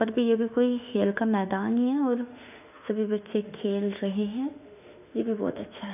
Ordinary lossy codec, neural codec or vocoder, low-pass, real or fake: none; autoencoder, 48 kHz, 32 numbers a frame, DAC-VAE, trained on Japanese speech; 3.6 kHz; fake